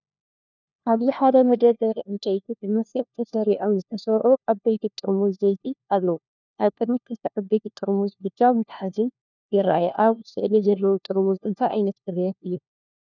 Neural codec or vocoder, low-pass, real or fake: codec, 16 kHz, 1 kbps, FunCodec, trained on LibriTTS, 50 frames a second; 7.2 kHz; fake